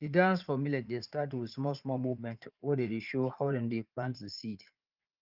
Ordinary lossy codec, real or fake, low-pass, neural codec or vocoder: Opus, 32 kbps; fake; 5.4 kHz; vocoder, 44.1 kHz, 80 mel bands, Vocos